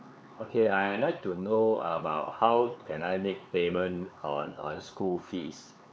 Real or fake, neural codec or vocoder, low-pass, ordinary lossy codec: fake; codec, 16 kHz, 4 kbps, X-Codec, HuBERT features, trained on LibriSpeech; none; none